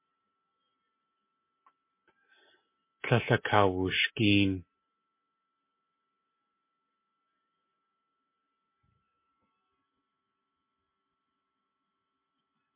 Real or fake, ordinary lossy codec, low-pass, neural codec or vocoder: real; MP3, 24 kbps; 3.6 kHz; none